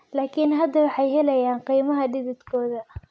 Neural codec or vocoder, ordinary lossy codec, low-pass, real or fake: none; none; none; real